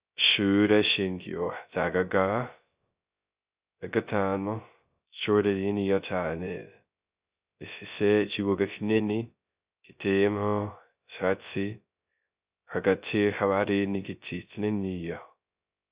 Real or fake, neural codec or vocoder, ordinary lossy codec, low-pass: fake; codec, 16 kHz, 0.2 kbps, FocalCodec; Opus, 64 kbps; 3.6 kHz